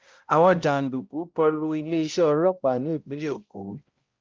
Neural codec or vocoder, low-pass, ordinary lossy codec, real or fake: codec, 16 kHz, 0.5 kbps, X-Codec, HuBERT features, trained on balanced general audio; 7.2 kHz; Opus, 32 kbps; fake